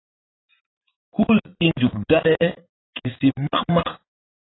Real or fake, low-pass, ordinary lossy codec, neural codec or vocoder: fake; 7.2 kHz; AAC, 16 kbps; vocoder, 44.1 kHz, 80 mel bands, Vocos